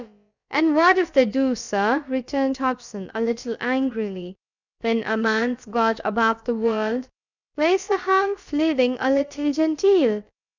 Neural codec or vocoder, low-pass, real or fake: codec, 16 kHz, about 1 kbps, DyCAST, with the encoder's durations; 7.2 kHz; fake